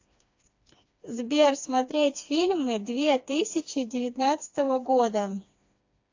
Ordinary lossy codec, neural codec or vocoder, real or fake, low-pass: AAC, 48 kbps; codec, 16 kHz, 2 kbps, FreqCodec, smaller model; fake; 7.2 kHz